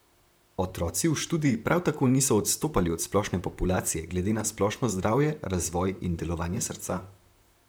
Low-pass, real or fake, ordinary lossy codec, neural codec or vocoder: none; fake; none; vocoder, 44.1 kHz, 128 mel bands, Pupu-Vocoder